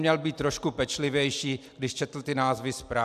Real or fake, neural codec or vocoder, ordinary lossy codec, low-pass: real; none; AAC, 96 kbps; 14.4 kHz